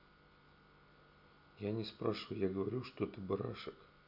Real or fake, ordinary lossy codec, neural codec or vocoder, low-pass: real; none; none; 5.4 kHz